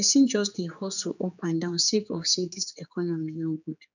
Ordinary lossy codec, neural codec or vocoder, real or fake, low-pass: none; codec, 16 kHz, 4 kbps, X-Codec, HuBERT features, trained on general audio; fake; 7.2 kHz